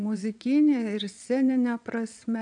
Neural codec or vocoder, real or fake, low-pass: vocoder, 22.05 kHz, 80 mel bands, WaveNeXt; fake; 9.9 kHz